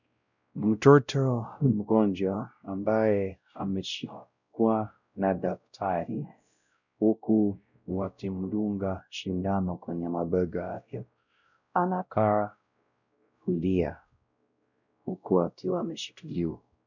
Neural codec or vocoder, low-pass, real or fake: codec, 16 kHz, 0.5 kbps, X-Codec, WavLM features, trained on Multilingual LibriSpeech; 7.2 kHz; fake